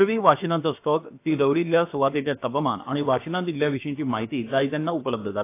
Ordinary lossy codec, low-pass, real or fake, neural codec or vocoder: AAC, 24 kbps; 3.6 kHz; fake; codec, 16 kHz, about 1 kbps, DyCAST, with the encoder's durations